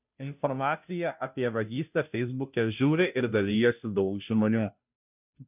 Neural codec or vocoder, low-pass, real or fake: codec, 16 kHz, 0.5 kbps, FunCodec, trained on Chinese and English, 25 frames a second; 3.6 kHz; fake